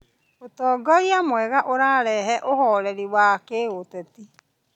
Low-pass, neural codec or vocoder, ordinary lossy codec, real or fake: 19.8 kHz; none; none; real